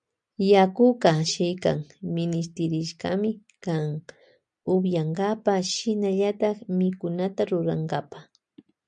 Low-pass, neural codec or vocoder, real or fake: 9.9 kHz; none; real